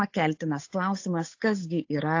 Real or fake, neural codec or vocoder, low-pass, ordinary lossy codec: fake; codec, 16 kHz, 8 kbps, FunCodec, trained on Chinese and English, 25 frames a second; 7.2 kHz; AAC, 48 kbps